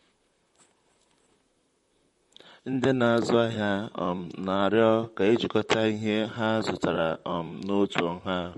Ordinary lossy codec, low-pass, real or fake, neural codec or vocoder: MP3, 48 kbps; 19.8 kHz; fake; vocoder, 44.1 kHz, 128 mel bands, Pupu-Vocoder